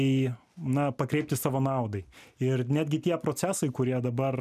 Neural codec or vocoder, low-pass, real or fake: none; 14.4 kHz; real